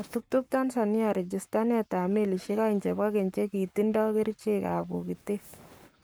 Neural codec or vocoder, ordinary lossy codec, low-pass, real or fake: codec, 44.1 kHz, 7.8 kbps, Pupu-Codec; none; none; fake